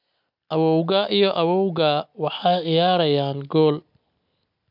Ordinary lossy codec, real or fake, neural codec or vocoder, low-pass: none; real; none; 5.4 kHz